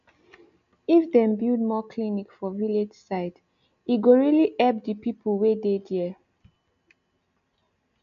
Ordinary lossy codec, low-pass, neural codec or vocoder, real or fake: AAC, 96 kbps; 7.2 kHz; none; real